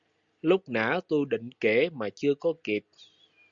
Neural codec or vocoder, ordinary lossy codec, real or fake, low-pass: none; Opus, 64 kbps; real; 7.2 kHz